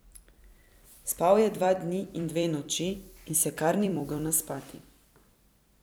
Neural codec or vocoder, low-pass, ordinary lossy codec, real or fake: vocoder, 44.1 kHz, 128 mel bands every 256 samples, BigVGAN v2; none; none; fake